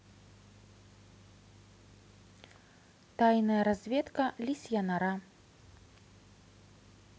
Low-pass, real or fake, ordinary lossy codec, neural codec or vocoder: none; real; none; none